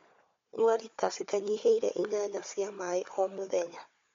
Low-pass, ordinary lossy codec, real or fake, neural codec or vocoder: 7.2 kHz; MP3, 48 kbps; fake; codec, 16 kHz, 4 kbps, FunCodec, trained on Chinese and English, 50 frames a second